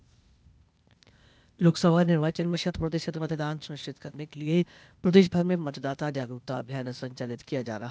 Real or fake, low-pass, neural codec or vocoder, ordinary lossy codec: fake; none; codec, 16 kHz, 0.8 kbps, ZipCodec; none